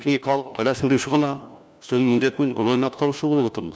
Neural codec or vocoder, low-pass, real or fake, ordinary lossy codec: codec, 16 kHz, 1 kbps, FunCodec, trained on LibriTTS, 50 frames a second; none; fake; none